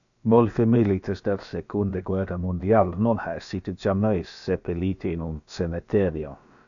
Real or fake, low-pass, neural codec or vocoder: fake; 7.2 kHz; codec, 16 kHz, about 1 kbps, DyCAST, with the encoder's durations